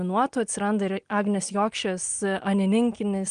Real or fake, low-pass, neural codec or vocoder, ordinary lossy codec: real; 9.9 kHz; none; Opus, 32 kbps